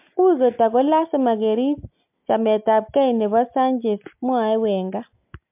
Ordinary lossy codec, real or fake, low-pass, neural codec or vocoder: MP3, 32 kbps; real; 3.6 kHz; none